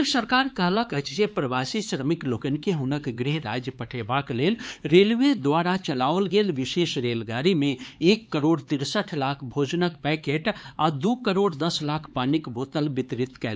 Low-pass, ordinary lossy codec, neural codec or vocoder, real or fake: none; none; codec, 16 kHz, 4 kbps, X-Codec, HuBERT features, trained on LibriSpeech; fake